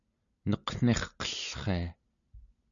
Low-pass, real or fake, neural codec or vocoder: 7.2 kHz; real; none